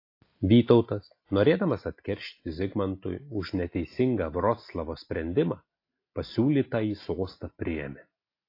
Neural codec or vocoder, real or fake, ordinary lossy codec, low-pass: none; real; AAC, 32 kbps; 5.4 kHz